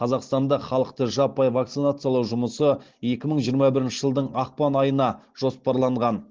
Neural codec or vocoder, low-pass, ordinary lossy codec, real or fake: none; 7.2 kHz; Opus, 16 kbps; real